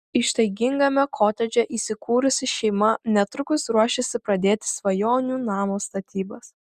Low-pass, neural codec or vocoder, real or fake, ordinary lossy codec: 14.4 kHz; none; real; Opus, 64 kbps